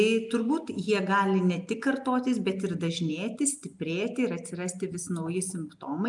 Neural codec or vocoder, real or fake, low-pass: none; real; 10.8 kHz